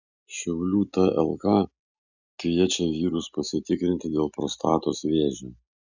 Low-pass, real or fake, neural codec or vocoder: 7.2 kHz; real; none